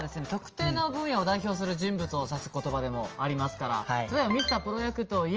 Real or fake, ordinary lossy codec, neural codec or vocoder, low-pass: real; Opus, 24 kbps; none; 7.2 kHz